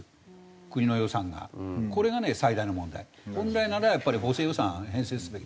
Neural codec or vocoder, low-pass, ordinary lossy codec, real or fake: none; none; none; real